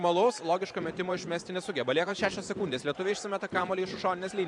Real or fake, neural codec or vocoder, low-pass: fake; vocoder, 44.1 kHz, 128 mel bands every 512 samples, BigVGAN v2; 10.8 kHz